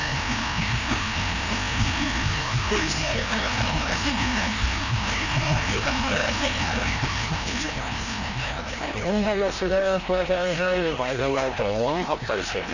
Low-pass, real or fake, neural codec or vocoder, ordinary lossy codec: 7.2 kHz; fake; codec, 16 kHz, 1 kbps, FreqCodec, larger model; none